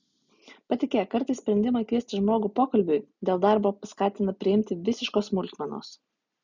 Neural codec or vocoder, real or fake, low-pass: none; real; 7.2 kHz